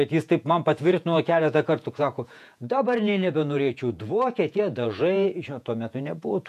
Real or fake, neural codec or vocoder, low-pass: fake; vocoder, 48 kHz, 128 mel bands, Vocos; 14.4 kHz